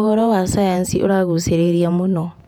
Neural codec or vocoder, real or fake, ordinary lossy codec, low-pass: vocoder, 48 kHz, 128 mel bands, Vocos; fake; none; 19.8 kHz